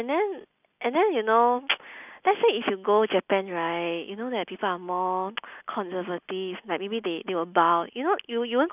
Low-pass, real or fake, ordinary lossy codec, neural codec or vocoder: 3.6 kHz; real; none; none